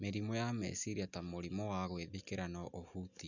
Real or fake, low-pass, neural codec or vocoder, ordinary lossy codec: real; 7.2 kHz; none; none